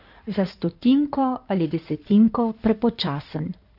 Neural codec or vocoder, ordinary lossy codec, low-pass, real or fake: codec, 16 kHz in and 24 kHz out, 2.2 kbps, FireRedTTS-2 codec; none; 5.4 kHz; fake